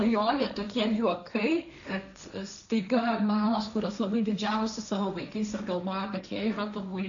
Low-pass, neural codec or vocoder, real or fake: 7.2 kHz; codec, 16 kHz, 1.1 kbps, Voila-Tokenizer; fake